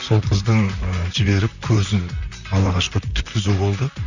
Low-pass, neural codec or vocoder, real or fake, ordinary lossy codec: 7.2 kHz; vocoder, 44.1 kHz, 128 mel bands, Pupu-Vocoder; fake; none